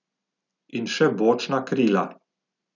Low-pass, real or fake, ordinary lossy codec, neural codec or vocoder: 7.2 kHz; real; none; none